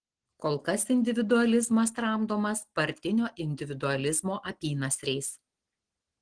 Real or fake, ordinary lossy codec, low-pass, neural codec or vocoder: real; Opus, 16 kbps; 9.9 kHz; none